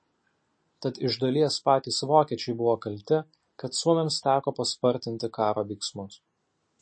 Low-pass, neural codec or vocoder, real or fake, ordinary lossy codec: 9.9 kHz; none; real; MP3, 32 kbps